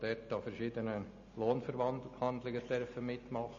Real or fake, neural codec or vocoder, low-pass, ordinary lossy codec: real; none; 7.2 kHz; MP3, 32 kbps